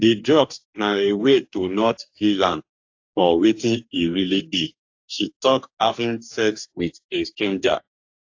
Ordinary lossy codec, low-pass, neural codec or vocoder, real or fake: AAC, 48 kbps; 7.2 kHz; codec, 44.1 kHz, 2.6 kbps, DAC; fake